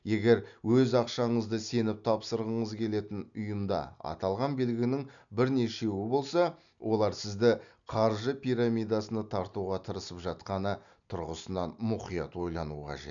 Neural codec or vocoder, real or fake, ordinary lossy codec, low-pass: none; real; none; 7.2 kHz